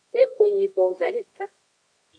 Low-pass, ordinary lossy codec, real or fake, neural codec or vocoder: 9.9 kHz; AAC, 48 kbps; fake; codec, 24 kHz, 0.9 kbps, WavTokenizer, medium music audio release